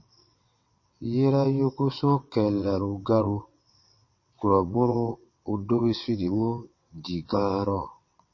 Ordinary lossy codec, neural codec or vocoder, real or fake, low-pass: MP3, 32 kbps; vocoder, 22.05 kHz, 80 mel bands, WaveNeXt; fake; 7.2 kHz